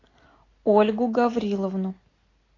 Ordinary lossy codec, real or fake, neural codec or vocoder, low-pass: AAC, 32 kbps; real; none; 7.2 kHz